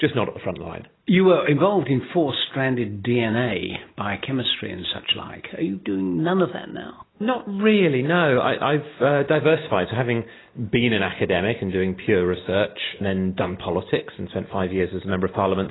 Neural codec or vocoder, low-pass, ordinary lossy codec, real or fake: none; 7.2 kHz; AAC, 16 kbps; real